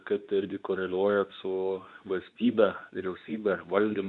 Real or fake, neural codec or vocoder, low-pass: fake; codec, 24 kHz, 0.9 kbps, WavTokenizer, medium speech release version 2; 10.8 kHz